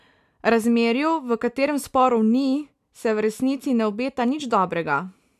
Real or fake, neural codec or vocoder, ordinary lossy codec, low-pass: real; none; none; 14.4 kHz